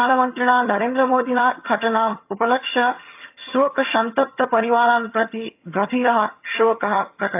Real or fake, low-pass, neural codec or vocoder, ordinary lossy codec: fake; 3.6 kHz; vocoder, 22.05 kHz, 80 mel bands, HiFi-GAN; AAC, 32 kbps